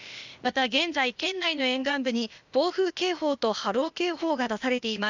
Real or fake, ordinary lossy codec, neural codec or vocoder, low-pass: fake; none; codec, 16 kHz, 0.8 kbps, ZipCodec; 7.2 kHz